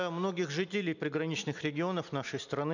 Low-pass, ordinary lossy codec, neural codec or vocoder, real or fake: 7.2 kHz; none; none; real